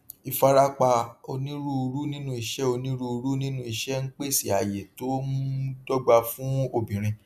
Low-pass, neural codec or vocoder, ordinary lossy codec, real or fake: 14.4 kHz; none; none; real